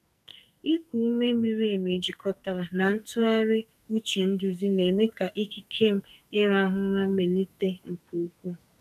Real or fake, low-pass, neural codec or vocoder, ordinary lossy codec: fake; 14.4 kHz; codec, 44.1 kHz, 2.6 kbps, SNAC; none